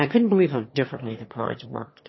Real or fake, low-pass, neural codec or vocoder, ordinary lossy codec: fake; 7.2 kHz; autoencoder, 22.05 kHz, a latent of 192 numbers a frame, VITS, trained on one speaker; MP3, 24 kbps